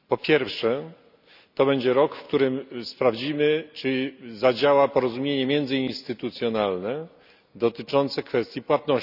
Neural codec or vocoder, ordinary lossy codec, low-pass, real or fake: none; none; 5.4 kHz; real